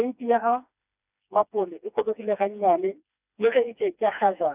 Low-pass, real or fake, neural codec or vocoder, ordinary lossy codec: 3.6 kHz; fake; codec, 16 kHz, 2 kbps, FreqCodec, smaller model; none